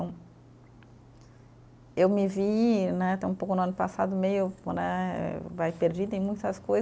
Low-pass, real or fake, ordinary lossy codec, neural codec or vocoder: none; real; none; none